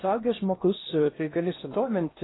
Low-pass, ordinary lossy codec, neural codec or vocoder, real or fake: 7.2 kHz; AAC, 16 kbps; codec, 16 kHz in and 24 kHz out, 0.6 kbps, FocalCodec, streaming, 4096 codes; fake